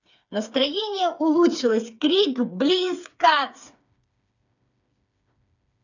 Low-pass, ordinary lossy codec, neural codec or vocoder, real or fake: 7.2 kHz; none; codec, 16 kHz, 4 kbps, FreqCodec, smaller model; fake